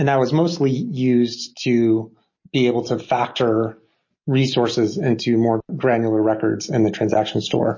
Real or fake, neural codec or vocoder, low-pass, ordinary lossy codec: real; none; 7.2 kHz; MP3, 32 kbps